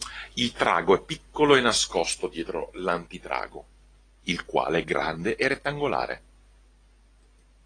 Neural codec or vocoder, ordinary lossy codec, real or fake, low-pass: none; AAC, 32 kbps; real; 9.9 kHz